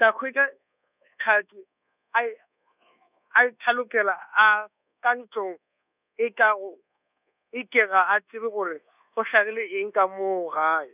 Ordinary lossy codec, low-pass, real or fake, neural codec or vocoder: none; 3.6 kHz; fake; codec, 24 kHz, 1.2 kbps, DualCodec